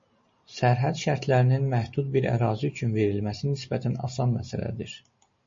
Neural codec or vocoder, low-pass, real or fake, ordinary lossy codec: none; 7.2 kHz; real; MP3, 32 kbps